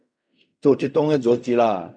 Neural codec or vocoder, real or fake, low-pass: codec, 16 kHz in and 24 kHz out, 0.4 kbps, LongCat-Audio-Codec, fine tuned four codebook decoder; fake; 9.9 kHz